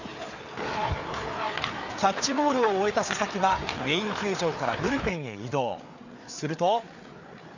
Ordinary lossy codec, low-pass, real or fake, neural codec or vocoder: none; 7.2 kHz; fake; codec, 16 kHz, 4 kbps, FreqCodec, larger model